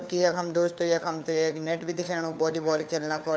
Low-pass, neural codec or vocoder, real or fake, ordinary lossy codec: none; codec, 16 kHz, 4 kbps, FunCodec, trained on LibriTTS, 50 frames a second; fake; none